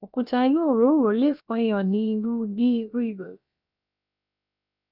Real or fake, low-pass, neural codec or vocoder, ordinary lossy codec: fake; 5.4 kHz; codec, 16 kHz, about 1 kbps, DyCAST, with the encoder's durations; none